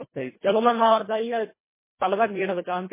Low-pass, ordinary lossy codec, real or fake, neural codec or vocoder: 3.6 kHz; MP3, 16 kbps; fake; codec, 24 kHz, 1.5 kbps, HILCodec